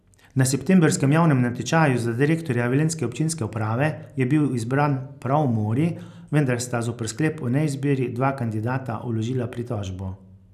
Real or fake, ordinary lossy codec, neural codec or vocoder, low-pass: real; none; none; 14.4 kHz